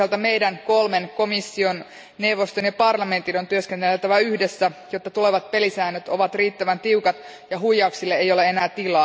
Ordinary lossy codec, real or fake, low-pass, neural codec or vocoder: none; real; none; none